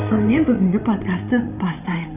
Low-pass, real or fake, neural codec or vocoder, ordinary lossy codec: 3.6 kHz; fake; codec, 16 kHz, 16 kbps, FreqCodec, larger model; none